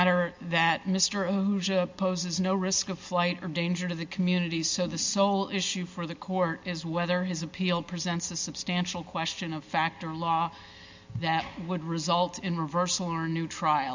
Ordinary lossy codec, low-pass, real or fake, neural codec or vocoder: MP3, 64 kbps; 7.2 kHz; real; none